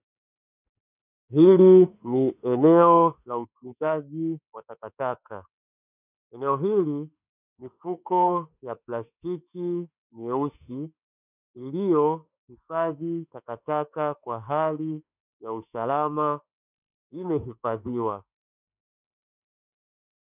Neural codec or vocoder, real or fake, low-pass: autoencoder, 48 kHz, 32 numbers a frame, DAC-VAE, trained on Japanese speech; fake; 3.6 kHz